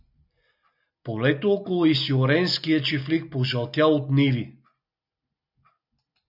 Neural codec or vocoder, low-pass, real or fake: none; 5.4 kHz; real